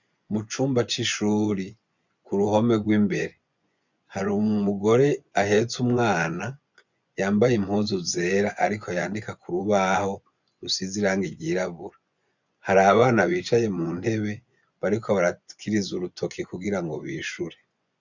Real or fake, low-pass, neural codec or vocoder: fake; 7.2 kHz; vocoder, 24 kHz, 100 mel bands, Vocos